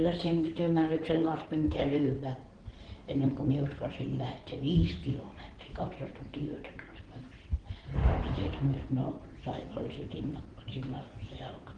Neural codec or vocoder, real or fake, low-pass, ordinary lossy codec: codec, 16 kHz in and 24 kHz out, 2.2 kbps, FireRedTTS-2 codec; fake; 9.9 kHz; Opus, 16 kbps